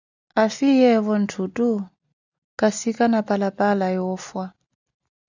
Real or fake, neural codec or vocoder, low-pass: real; none; 7.2 kHz